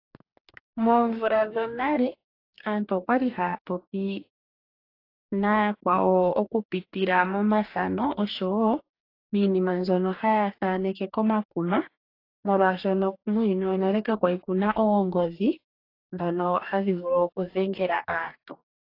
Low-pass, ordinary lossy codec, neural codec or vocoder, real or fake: 5.4 kHz; AAC, 32 kbps; codec, 44.1 kHz, 2.6 kbps, DAC; fake